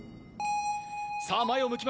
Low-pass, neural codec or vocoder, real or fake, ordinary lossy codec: none; none; real; none